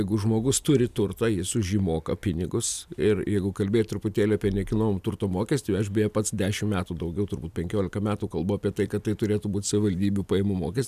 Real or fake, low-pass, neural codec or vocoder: real; 14.4 kHz; none